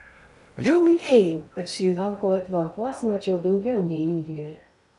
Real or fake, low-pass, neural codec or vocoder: fake; 10.8 kHz; codec, 16 kHz in and 24 kHz out, 0.6 kbps, FocalCodec, streaming, 4096 codes